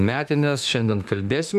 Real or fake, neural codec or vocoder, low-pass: fake; autoencoder, 48 kHz, 32 numbers a frame, DAC-VAE, trained on Japanese speech; 14.4 kHz